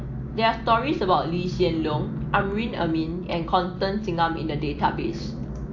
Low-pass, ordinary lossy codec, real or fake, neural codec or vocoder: 7.2 kHz; AAC, 48 kbps; real; none